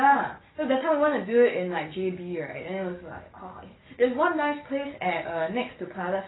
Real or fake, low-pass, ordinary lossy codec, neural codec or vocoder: fake; 7.2 kHz; AAC, 16 kbps; codec, 44.1 kHz, 7.8 kbps, DAC